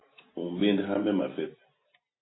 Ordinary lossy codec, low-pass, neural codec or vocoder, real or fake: AAC, 16 kbps; 7.2 kHz; none; real